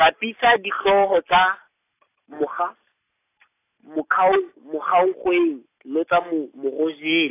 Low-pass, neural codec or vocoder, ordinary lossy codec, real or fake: 3.6 kHz; none; AAC, 24 kbps; real